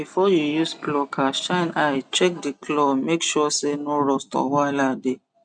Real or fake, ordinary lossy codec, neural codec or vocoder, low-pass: real; none; none; 9.9 kHz